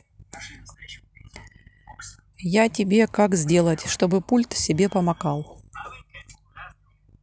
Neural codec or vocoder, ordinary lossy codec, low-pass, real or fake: none; none; none; real